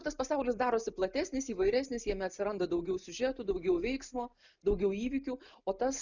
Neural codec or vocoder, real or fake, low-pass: none; real; 7.2 kHz